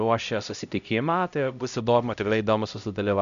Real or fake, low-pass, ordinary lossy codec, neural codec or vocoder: fake; 7.2 kHz; AAC, 96 kbps; codec, 16 kHz, 0.5 kbps, X-Codec, HuBERT features, trained on LibriSpeech